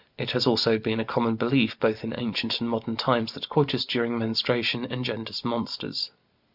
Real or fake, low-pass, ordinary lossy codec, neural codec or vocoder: real; 5.4 kHz; Opus, 64 kbps; none